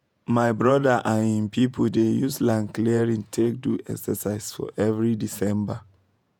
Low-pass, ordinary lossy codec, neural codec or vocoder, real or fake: none; none; vocoder, 48 kHz, 128 mel bands, Vocos; fake